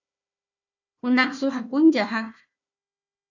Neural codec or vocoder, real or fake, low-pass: codec, 16 kHz, 1 kbps, FunCodec, trained on Chinese and English, 50 frames a second; fake; 7.2 kHz